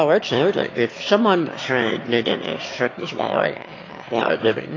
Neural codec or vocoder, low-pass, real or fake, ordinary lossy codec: autoencoder, 22.05 kHz, a latent of 192 numbers a frame, VITS, trained on one speaker; 7.2 kHz; fake; AAC, 32 kbps